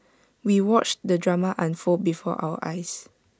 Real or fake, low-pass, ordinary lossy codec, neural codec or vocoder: real; none; none; none